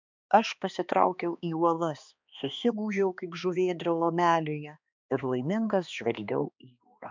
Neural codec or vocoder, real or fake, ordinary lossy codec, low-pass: codec, 16 kHz, 2 kbps, X-Codec, HuBERT features, trained on balanced general audio; fake; MP3, 64 kbps; 7.2 kHz